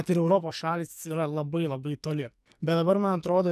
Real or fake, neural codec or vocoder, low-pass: fake; codec, 44.1 kHz, 2.6 kbps, SNAC; 14.4 kHz